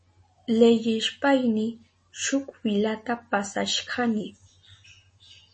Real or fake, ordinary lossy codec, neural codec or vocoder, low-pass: real; MP3, 32 kbps; none; 10.8 kHz